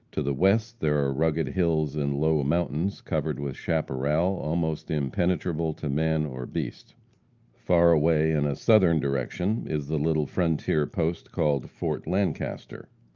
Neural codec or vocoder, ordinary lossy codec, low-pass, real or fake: none; Opus, 24 kbps; 7.2 kHz; real